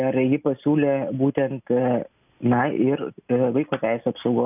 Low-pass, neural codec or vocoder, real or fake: 3.6 kHz; none; real